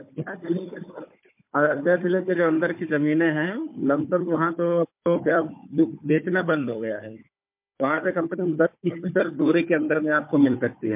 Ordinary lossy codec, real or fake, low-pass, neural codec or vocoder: MP3, 32 kbps; fake; 3.6 kHz; codec, 16 kHz, 4 kbps, FunCodec, trained on Chinese and English, 50 frames a second